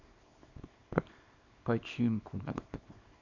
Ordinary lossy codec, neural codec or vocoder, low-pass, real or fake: Opus, 64 kbps; codec, 24 kHz, 0.9 kbps, WavTokenizer, small release; 7.2 kHz; fake